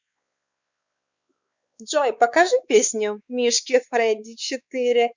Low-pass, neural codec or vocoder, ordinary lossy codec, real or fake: 7.2 kHz; codec, 16 kHz, 4 kbps, X-Codec, WavLM features, trained on Multilingual LibriSpeech; Opus, 64 kbps; fake